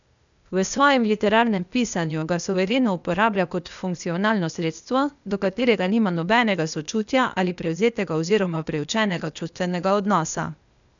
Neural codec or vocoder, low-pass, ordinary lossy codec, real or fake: codec, 16 kHz, 0.8 kbps, ZipCodec; 7.2 kHz; none; fake